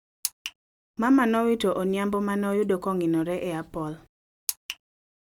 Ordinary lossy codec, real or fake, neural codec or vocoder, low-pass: none; real; none; 19.8 kHz